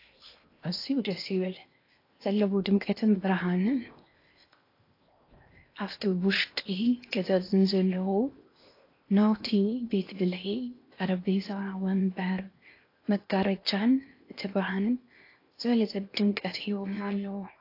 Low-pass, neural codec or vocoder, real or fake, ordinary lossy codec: 5.4 kHz; codec, 16 kHz in and 24 kHz out, 0.8 kbps, FocalCodec, streaming, 65536 codes; fake; AAC, 32 kbps